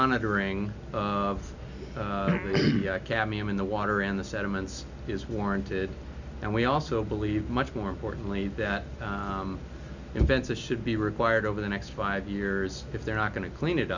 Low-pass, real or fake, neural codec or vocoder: 7.2 kHz; real; none